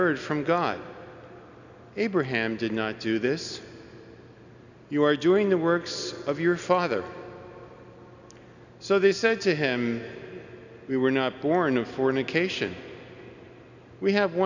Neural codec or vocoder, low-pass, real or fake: none; 7.2 kHz; real